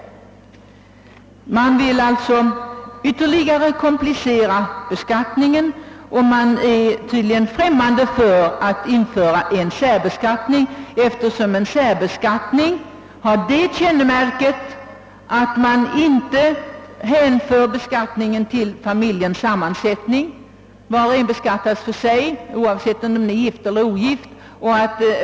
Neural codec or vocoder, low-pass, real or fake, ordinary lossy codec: none; none; real; none